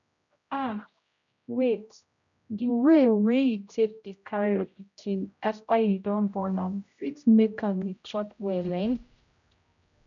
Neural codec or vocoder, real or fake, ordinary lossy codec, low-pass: codec, 16 kHz, 0.5 kbps, X-Codec, HuBERT features, trained on general audio; fake; none; 7.2 kHz